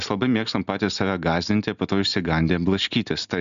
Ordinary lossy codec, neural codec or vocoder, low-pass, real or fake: MP3, 96 kbps; none; 7.2 kHz; real